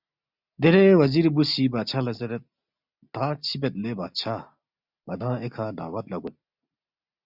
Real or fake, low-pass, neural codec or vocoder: real; 5.4 kHz; none